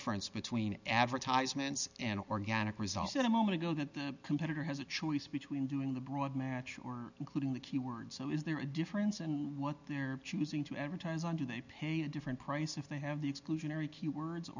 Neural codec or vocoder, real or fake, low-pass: none; real; 7.2 kHz